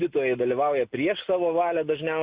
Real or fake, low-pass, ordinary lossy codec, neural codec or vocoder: real; 3.6 kHz; Opus, 64 kbps; none